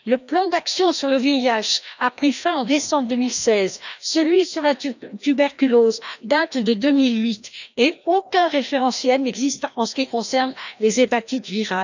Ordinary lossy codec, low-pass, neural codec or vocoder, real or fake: none; 7.2 kHz; codec, 16 kHz, 1 kbps, FreqCodec, larger model; fake